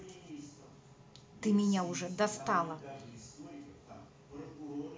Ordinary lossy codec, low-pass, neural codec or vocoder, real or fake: none; none; none; real